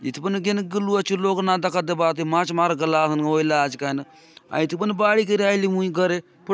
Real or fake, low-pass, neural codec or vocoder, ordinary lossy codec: real; none; none; none